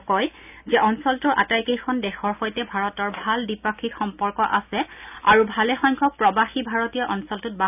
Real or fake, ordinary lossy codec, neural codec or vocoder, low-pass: real; none; none; 3.6 kHz